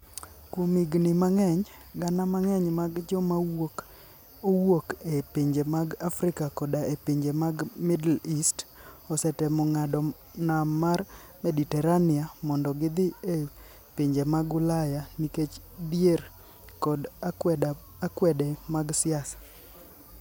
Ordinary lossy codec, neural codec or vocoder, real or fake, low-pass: none; none; real; none